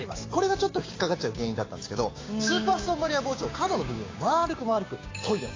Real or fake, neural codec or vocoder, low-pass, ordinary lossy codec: fake; codec, 16 kHz, 6 kbps, DAC; 7.2 kHz; AAC, 32 kbps